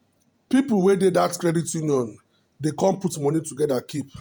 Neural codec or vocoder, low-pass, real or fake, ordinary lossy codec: vocoder, 48 kHz, 128 mel bands, Vocos; none; fake; none